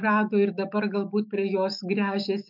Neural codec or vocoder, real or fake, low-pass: autoencoder, 48 kHz, 128 numbers a frame, DAC-VAE, trained on Japanese speech; fake; 5.4 kHz